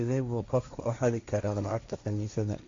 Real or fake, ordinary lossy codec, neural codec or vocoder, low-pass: fake; MP3, 48 kbps; codec, 16 kHz, 1.1 kbps, Voila-Tokenizer; 7.2 kHz